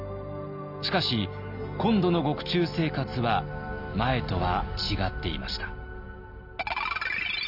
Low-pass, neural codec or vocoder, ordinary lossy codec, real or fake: 5.4 kHz; none; none; real